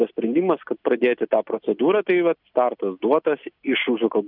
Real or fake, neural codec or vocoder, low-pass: real; none; 5.4 kHz